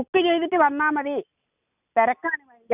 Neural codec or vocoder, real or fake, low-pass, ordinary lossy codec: none; real; 3.6 kHz; none